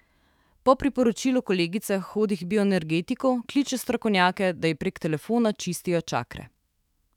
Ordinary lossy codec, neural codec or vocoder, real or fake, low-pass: none; autoencoder, 48 kHz, 128 numbers a frame, DAC-VAE, trained on Japanese speech; fake; 19.8 kHz